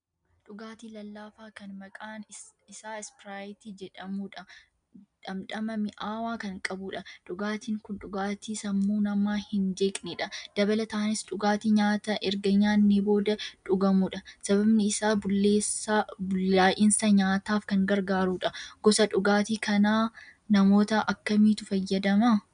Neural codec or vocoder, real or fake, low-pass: none; real; 9.9 kHz